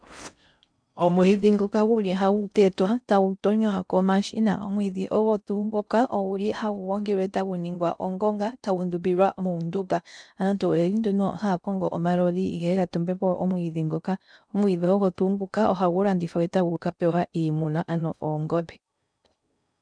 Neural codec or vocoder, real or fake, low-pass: codec, 16 kHz in and 24 kHz out, 0.6 kbps, FocalCodec, streaming, 4096 codes; fake; 9.9 kHz